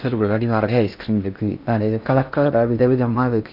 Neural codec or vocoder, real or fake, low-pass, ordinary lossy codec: codec, 16 kHz in and 24 kHz out, 0.6 kbps, FocalCodec, streaming, 4096 codes; fake; 5.4 kHz; none